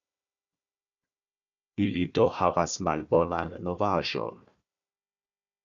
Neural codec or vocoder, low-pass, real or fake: codec, 16 kHz, 1 kbps, FunCodec, trained on Chinese and English, 50 frames a second; 7.2 kHz; fake